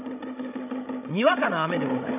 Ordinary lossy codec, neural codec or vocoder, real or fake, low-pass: none; codec, 16 kHz, 16 kbps, FreqCodec, larger model; fake; 3.6 kHz